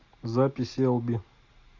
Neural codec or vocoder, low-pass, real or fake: none; 7.2 kHz; real